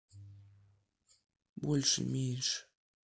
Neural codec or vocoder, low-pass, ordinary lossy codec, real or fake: none; none; none; real